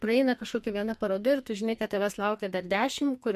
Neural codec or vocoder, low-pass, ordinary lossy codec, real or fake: codec, 44.1 kHz, 2.6 kbps, SNAC; 14.4 kHz; MP3, 64 kbps; fake